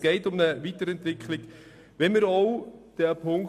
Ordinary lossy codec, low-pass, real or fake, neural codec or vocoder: MP3, 96 kbps; 14.4 kHz; real; none